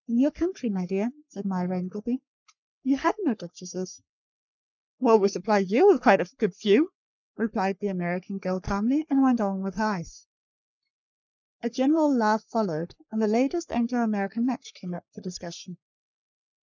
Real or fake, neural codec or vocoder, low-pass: fake; codec, 44.1 kHz, 3.4 kbps, Pupu-Codec; 7.2 kHz